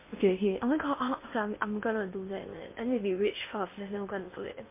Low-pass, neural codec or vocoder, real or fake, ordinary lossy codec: 3.6 kHz; codec, 16 kHz in and 24 kHz out, 0.8 kbps, FocalCodec, streaming, 65536 codes; fake; MP3, 24 kbps